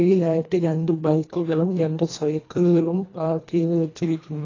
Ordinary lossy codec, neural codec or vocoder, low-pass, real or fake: AAC, 32 kbps; codec, 24 kHz, 1.5 kbps, HILCodec; 7.2 kHz; fake